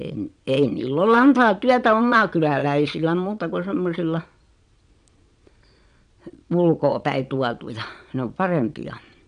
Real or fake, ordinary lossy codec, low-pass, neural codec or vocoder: fake; none; 9.9 kHz; vocoder, 22.05 kHz, 80 mel bands, Vocos